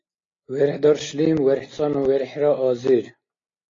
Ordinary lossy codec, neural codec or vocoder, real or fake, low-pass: AAC, 32 kbps; none; real; 7.2 kHz